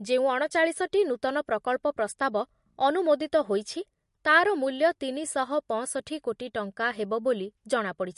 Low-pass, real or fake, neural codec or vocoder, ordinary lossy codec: 14.4 kHz; real; none; MP3, 48 kbps